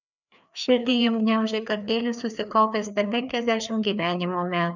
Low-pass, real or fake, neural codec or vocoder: 7.2 kHz; fake; codec, 16 kHz, 2 kbps, FreqCodec, larger model